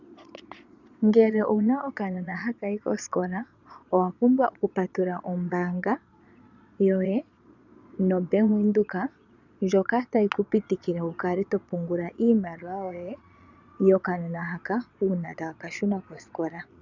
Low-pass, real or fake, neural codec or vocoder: 7.2 kHz; fake; vocoder, 22.05 kHz, 80 mel bands, Vocos